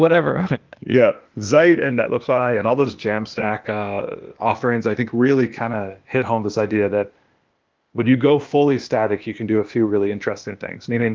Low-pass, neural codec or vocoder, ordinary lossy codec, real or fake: 7.2 kHz; codec, 16 kHz, 0.8 kbps, ZipCodec; Opus, 32 kbps; fake